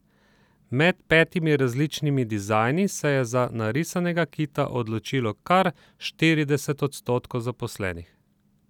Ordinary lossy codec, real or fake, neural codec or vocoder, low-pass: none; real; none; 19.8 kHz